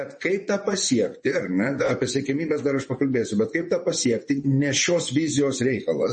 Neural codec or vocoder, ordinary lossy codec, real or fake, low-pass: vocoder, 24 kHz, 100 mel bands, Vocos; MP3, 32 kbps; fake; 10.8 kHz